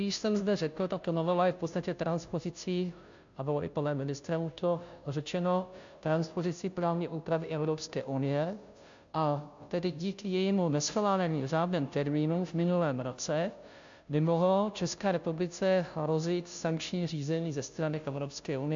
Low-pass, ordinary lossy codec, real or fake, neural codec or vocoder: 7.2 kHz; AAC, 64 kbps; fake; codec, 16 kHz, 0.5 kbps, FunCodec, trained on Chinese and English, 25 frames a second